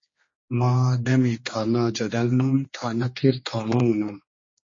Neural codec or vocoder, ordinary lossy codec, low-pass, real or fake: codec, 16 kHz, 2 kbps, X-Codec, HuBERT features, trained on general audio; MP3, 32 kbps; 7.2 kHz; fake